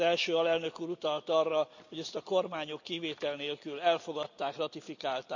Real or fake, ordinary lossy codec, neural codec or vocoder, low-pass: real; none; none; 7.2 kHz